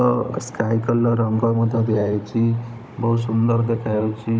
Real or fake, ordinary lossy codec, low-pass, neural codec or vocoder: fake; none; none; codec, 16 kHz, 16 kbps, FunCodec, trained on Chinese and English, 50 frames a second